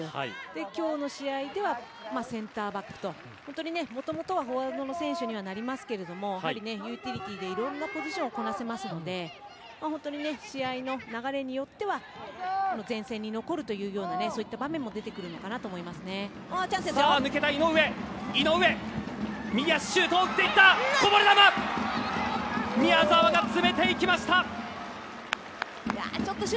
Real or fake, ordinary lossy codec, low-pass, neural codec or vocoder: real; none; none; none